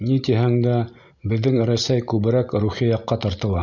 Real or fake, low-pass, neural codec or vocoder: real; 7.2 kHz; none